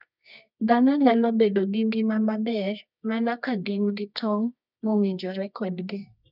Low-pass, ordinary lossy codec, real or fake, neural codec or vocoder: 5.4 kHz; none; fake; codec, 24 kHz, 0.9 kbps, WavTokenizer, medium music audio release